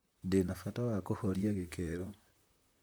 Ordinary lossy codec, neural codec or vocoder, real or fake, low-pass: none; vocoder, 44.1 kHz, 128 mel bands, Pupu-Vocoder; fake; none